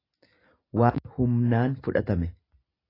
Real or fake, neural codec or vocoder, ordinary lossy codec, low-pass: real; none; AAC, 24 kbps; 5.4 kHz